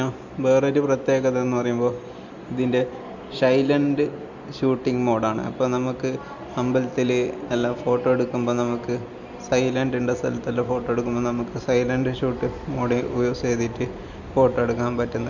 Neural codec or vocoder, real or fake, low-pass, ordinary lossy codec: none; real; 7.2 kHz; none